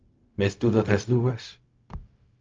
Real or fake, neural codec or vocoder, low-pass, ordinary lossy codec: fake; codec, 16 kHz, 0.4 kbps, LongCat-Audio-Codec; 7.2 kHz; Opus, 24 kbps